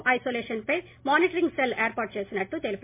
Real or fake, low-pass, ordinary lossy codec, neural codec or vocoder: real; 3.6 kHz; MP3, 24 kbps; none